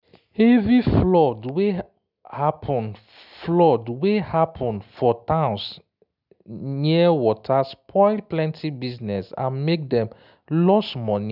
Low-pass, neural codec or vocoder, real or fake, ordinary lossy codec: 5.4 kHz; none; real; none